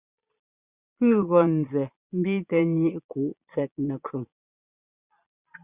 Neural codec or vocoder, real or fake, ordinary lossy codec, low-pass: vocoder, 22.05 kHz, 80 mel bands, Vocos; fake; Opus, 64 kbps; 3.6 kHz